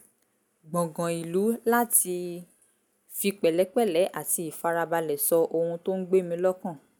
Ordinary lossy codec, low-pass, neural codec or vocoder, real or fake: none; none; none; real